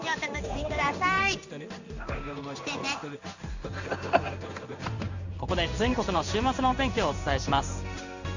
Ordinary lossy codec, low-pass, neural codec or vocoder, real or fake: none; 7.2 kHz; codec, 16 kHz in and 24 kHz out, 1 kbps, XY-Tokenizer; fake